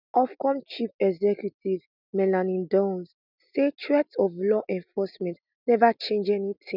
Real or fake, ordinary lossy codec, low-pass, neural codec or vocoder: real; none; 5.4 kHz; none